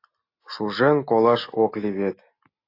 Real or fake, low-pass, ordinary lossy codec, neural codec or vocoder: real; 5.4 kHz; AAC, 32 kbps; none